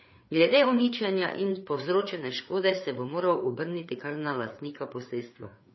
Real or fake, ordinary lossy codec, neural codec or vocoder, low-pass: fake; MP3, 24 kbps; codec, 16 kHz, 4 kbps, FreqCodec, larger model; 7.2 kHz